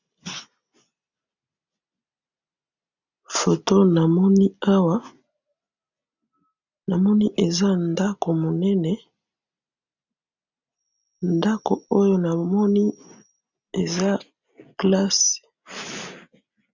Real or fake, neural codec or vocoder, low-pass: real; none; 7.2 kHz